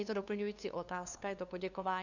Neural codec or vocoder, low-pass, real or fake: codec, 16 kHz, 2 kbps, FunCodec, trained on LibriTTS, 25 frames a second; 7.2 kHz; fake